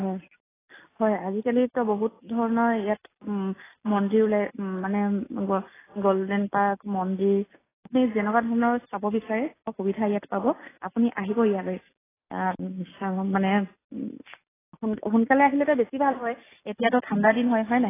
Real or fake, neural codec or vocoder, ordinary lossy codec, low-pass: real; none; AAC, 16 kbps; 3.6 kHz